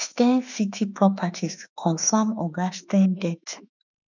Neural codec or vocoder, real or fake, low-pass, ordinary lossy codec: autoencoder, 48 kHz, 32 numbers a frame, DAC-VAE, trained on Japanese speech; fake; 7.2 kHz; none